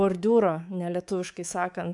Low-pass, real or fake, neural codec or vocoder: 10.8 kHz; fake; codec, 24 kHz, 3.1 kbps, DualCodec